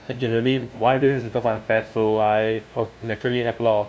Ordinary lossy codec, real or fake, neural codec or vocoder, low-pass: none; fake; codec, 16 kHz, 0.5 kbps, FunCodec, trained on LibriTTS, 25 frames a second; none